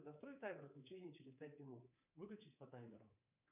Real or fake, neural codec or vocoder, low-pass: fake; codec, 24 kHz, 3.1 kbps, DualCodec; 3.6 kHz